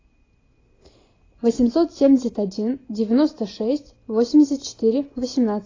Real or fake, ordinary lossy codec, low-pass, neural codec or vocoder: real; AAC, 32 kbps; 7.2 kHz; none